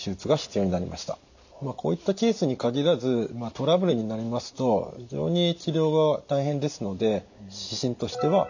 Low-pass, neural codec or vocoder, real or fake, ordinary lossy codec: 7.2 kHz; none; real; none